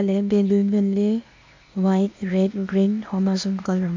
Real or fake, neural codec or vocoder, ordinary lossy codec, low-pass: fake; codec, 16 kHz, 0.8 kbps, ZipCodec; MP3, 48 kbps; 7.2 kHz